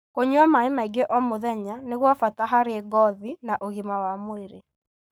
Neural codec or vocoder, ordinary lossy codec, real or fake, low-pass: codec, 44.1 kHz, 7.8 kbps, Pupu-Codec; none; fake; none